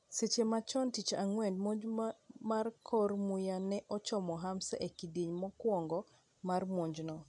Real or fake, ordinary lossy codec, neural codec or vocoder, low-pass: real; none; none; 10.8 kHz